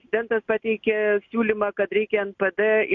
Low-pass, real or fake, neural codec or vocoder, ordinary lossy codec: 7.2 kHz; real; none; MP3, 48 kbps